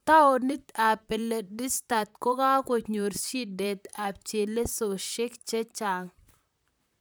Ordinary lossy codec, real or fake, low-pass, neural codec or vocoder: none; fake; none; vocoder, 44.1 kHz, 128 mel bands, Pupu-Vocoder